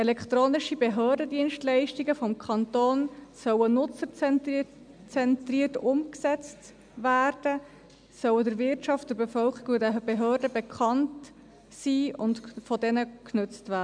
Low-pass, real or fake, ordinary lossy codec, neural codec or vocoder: 9.9 kHz; real; MP3, 96 kbps; none